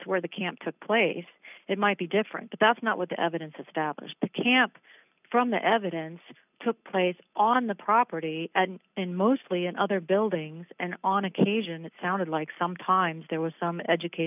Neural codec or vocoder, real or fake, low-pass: none; real; 3.6 kHz